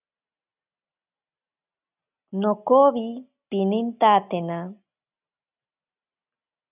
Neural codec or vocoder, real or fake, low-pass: none; real; 3.6 kHz